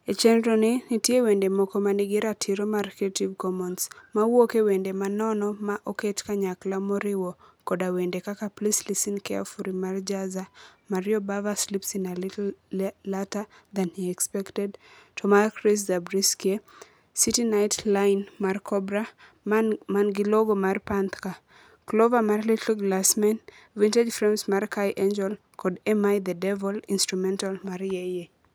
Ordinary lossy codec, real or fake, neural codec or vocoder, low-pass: none; real; none; none